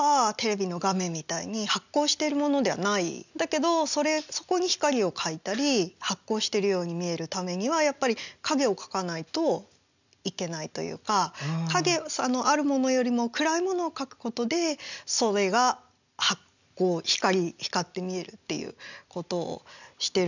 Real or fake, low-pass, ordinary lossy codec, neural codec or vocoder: real; 7.2 kHz; none; none